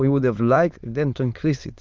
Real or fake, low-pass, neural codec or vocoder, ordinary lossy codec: fake; 7.2 kHz; autoencoder, 22.05 kHz, a latent of 192 numbers a frame, VITS, trained on many speakers; Opus, 32 kbps